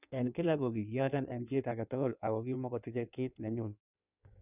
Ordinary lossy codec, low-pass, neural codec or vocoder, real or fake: none; 3.6 kHz; codec, 16 kHz in and 24 kHz out, 1.1 kbps, FireRedTTS-2 codec; fake